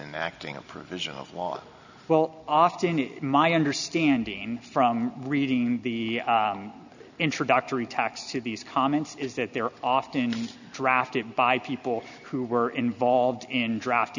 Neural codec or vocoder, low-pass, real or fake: none; 7.2 kHz; real